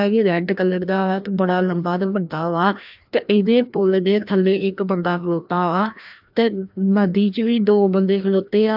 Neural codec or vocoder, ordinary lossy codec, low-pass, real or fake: codec, 16 kHz, 1 kbps, FreqCodec, larger model; AAC, 48 kbps; 5.4 kHz; fake